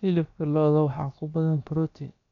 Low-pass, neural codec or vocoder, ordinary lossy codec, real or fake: 7.2 kHz; codec, 16 kHz, about 1 kbps, DyCAST, with the encoder's durations; none; fake